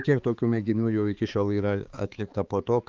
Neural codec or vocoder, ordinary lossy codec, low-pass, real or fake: codec, 16 kHz, 4 kbps, X-Codec, HuBERT features, trained on balanced general audio; Opus, 24 kbps; 7.2 kHz; fake